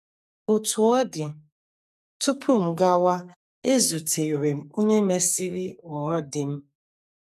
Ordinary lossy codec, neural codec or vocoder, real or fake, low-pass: none; codec, 44.1 kHz, 2.6 kbps, SNAC; fake; 14.4 kHz